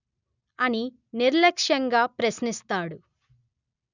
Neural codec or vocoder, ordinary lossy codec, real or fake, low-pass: none; none; real; 7.2 kHz